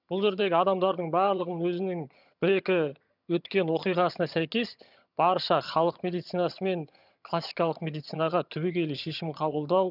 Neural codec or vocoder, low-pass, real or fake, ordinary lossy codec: vocoder, 22.05 kHz, 80 mel bands, HiFi-GAN; 5.4 kHz; fake; none